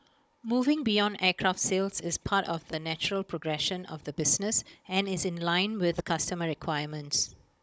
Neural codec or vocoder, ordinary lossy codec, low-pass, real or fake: codec, 16 kHz, 16 kbps, FunCodec, trained on Chinese and English, 50 frames a second; none; none; fake